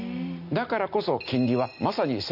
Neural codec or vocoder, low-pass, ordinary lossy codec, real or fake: none; 5.4 kHz; none; real